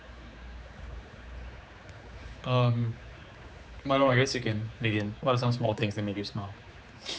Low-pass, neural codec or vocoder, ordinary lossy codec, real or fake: none; codec, 16 kHz, 4 kbps, X-Codec, HuBERT features, trained on general audio; none; fake